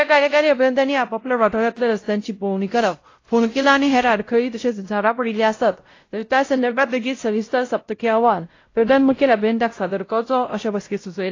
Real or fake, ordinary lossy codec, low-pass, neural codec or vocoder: fake; AAC, 32 kbps; 7.2 kHz; codec, 16 kHz, 0.5 kbps, X-Codec, WavLM features, trained on Multilingual LibriSpeech